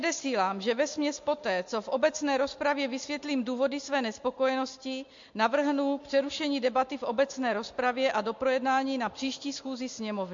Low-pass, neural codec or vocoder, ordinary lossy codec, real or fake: 7.2 kHz; none; MP3, 48 kbps; real